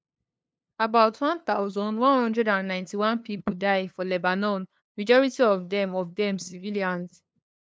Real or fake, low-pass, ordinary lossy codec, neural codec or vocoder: fake; none; none; codec, 16 kHz, 2 kbps, FunCodec, trained on LibriTTS, 25 frames a second